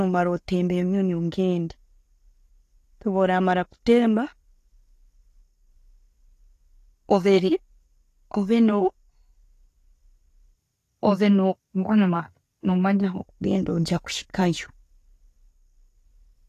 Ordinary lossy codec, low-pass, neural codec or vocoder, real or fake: AAC, 48 kbps; 14.4 kHz; none; real